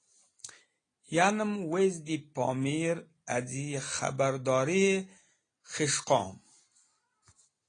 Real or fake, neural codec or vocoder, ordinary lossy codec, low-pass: real; none; AAC, 32 kbps; 9.9 kHz